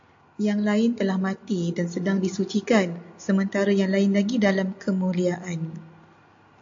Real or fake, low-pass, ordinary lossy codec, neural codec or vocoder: real; 7.2 kHz; AAC, 48 kbps; none